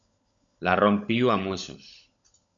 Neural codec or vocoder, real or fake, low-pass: codec, 16 kHz, 16 kbps, FunCodec, trained on LibriTTS, 50 frames a second; fake; 7.2 kHz